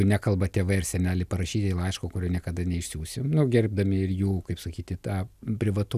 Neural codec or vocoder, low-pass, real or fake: none; 14.4 kHz; real